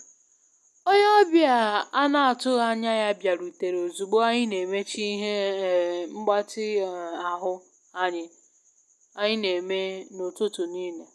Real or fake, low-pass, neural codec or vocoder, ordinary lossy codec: real; none; none; none